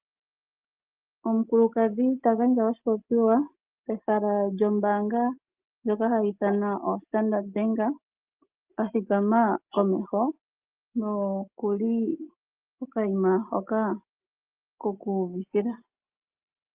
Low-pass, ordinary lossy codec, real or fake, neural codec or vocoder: 3.6 kHz; Opus, 24 kbps; real; none